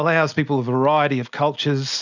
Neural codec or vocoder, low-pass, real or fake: none; 7.2 kHz; real